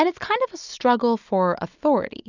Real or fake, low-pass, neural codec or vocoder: real; 7.2 kHz; none